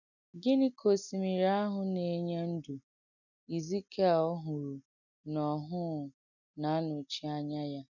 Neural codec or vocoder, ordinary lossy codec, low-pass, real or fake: none; none; 7.2 kHz; real